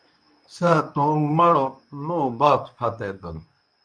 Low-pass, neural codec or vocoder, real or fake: 9.9 kHz; codec, 24 kHz, 0.9 kbps, WavTokenizer, medium speech release version 2; fake